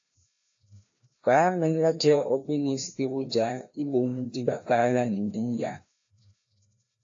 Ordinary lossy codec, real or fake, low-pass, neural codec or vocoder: AAC, 48 kbps; fake; 7.2 kHz; codec, 16 kHz, 1 kbps, FreqCodec, larger model